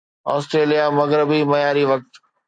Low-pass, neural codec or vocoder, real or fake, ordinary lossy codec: 9.9 kHz; none; real; AAC, 64 kbps